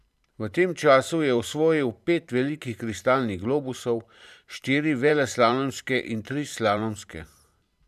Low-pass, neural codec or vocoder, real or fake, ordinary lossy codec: 14.4 kHz; vocoder, 44.1 kHz, 128 mel bands every 512 samples, BigVGAN v2; fake; none